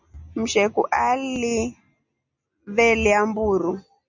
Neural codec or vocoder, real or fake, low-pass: none; real; 7.2 kHz